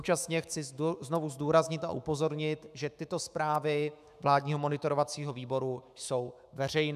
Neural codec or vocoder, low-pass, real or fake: autoencoder, 48 kHz, 128 numbers a frame, DAC-VAE, trained on Japanese speech; 14.4 kHz; fake